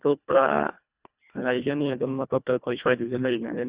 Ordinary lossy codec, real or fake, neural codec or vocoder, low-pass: Opus, 64 kbps; fake; codec, 24 kHz, 1.5 kbps, HILCodec; 3.6 kHz